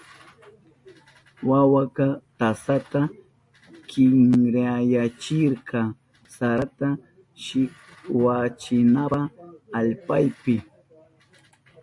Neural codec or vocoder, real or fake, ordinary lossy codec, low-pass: none; real; MP3, 48 kbps; 10.8 kHz